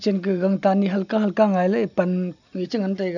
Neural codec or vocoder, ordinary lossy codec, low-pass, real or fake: none; none; 7.2 kHz; real